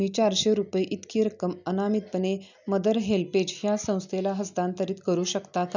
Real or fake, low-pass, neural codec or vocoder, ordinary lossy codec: real; 7.2 kHz; none; none